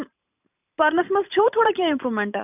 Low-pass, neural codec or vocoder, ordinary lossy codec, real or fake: 3.6 kHz; vocoder, 44.1 kHz, 128 mel bands every 512 samples, BigVGAN v2; none; fake